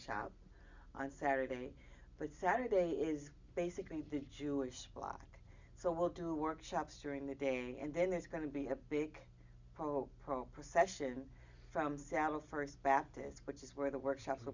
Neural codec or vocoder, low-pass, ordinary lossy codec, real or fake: none; 7.2 kHz; Opus, 64 kbps; real